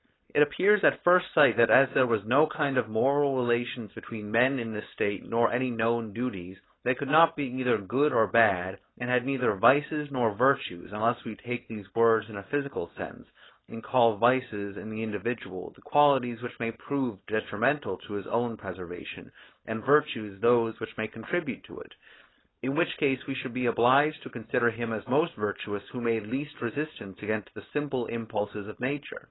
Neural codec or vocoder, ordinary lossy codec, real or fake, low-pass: codec, 16 kHz, 4.8 kbps, FACodec; AAC, 16 kbps; fake; 7.2 kHz